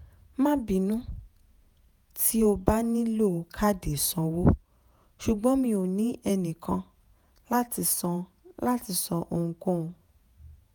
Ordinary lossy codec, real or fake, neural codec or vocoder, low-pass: none; fake; vocoder, 48 kHz, 128 mel bands, Vocos; none